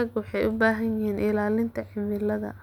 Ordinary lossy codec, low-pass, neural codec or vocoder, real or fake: none; 19.8 kHz; none; real